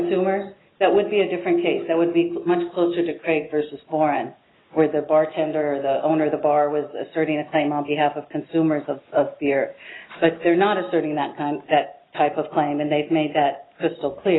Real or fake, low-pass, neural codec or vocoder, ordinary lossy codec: real; 7.2 kHz; none; AAC, 16 kbps